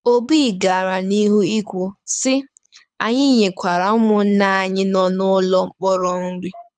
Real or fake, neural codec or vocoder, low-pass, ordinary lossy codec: fake; codec, 24 kHz, 6 kbps, HILCodec; 9.9 kHz; none